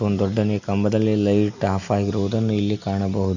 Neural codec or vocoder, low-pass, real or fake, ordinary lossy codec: none; 7.2 kHz; real; none